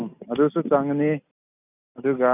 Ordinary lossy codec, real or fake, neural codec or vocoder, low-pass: none; real; none; 3.6 kHz